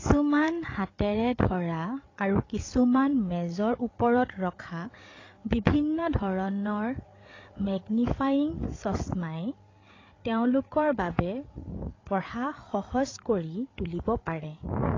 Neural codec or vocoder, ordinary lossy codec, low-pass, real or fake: codec, 16 kHz, 8 kbps, FreqCodec, larger model; AAC, 32 kbps; 7.2 kHz; fake